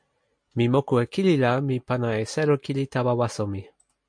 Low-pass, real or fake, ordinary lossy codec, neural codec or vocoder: 9.9 kHz; real; MP3, 64 kbps; none